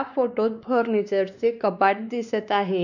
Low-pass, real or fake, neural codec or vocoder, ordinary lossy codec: 7.2 kHz; fake; codec, 16 kHz, 2 kbps, X-Codec, WavLM features, trained on Multilingual LibriSpeech; none